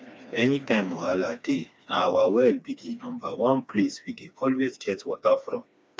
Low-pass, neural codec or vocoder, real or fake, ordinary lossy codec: none; codec, 16 kHz, 2 kbps, FreqCodec, smaller model; fake; none